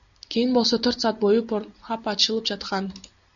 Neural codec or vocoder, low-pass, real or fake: none; 7.2 kHz; real